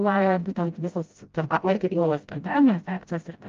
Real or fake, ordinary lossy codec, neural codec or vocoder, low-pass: fake; Opus, 32 kbps; codec, 16 kHz, 0.5 kbps, FreqCodec, smaller model; 7.2 kHz